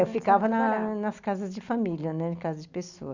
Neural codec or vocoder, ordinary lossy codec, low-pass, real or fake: none; Opus, 64 kbps; 7.2 kHz; real